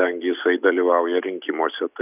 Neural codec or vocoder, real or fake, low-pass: none; real; 3.6 kHz